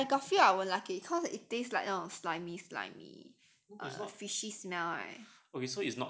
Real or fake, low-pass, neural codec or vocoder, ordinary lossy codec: real; none; none; none